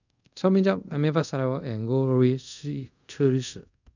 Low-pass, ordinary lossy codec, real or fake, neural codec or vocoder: 7.2 kHz; none; fake; codec, 24 kHz, 0.5 kbps, DualCodec